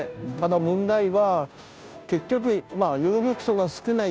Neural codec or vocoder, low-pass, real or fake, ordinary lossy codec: codec, 16 kHz, 0.5 kbps, FunCodec, trained on Chinese and English, 25 frames a second; none; fake; none